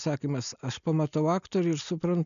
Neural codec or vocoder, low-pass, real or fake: none; 7.2 kHz; real